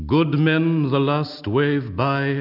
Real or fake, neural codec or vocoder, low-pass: real; none; 5.4 kHz